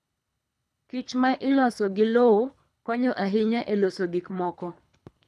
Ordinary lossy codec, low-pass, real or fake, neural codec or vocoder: none; none; fake; codec, 24 kHz, 3 kbps, HILCodec